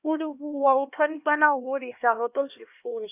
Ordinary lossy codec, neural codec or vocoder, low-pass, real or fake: none; codec, 16 kHz, 1 kbps, X-Codec, HuBERT features, trained on LibriSpeech; 3.6 kHz; fake